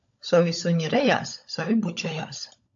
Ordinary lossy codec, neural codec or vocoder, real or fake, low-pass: AAC, 64 kbps; codec, 16 kHz, 16 kbps, FunCodec, trained on LibriTTS, 50 frames a second; fake; 7.2 kHz